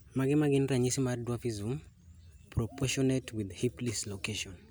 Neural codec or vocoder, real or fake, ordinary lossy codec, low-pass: none; real; none; none